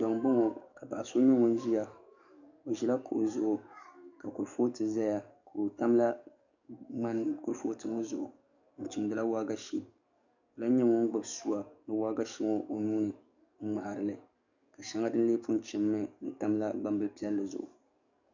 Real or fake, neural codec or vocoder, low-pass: fake; codec, 44.1 kHz, 7.8 kbps, DAC; 7.2 kHz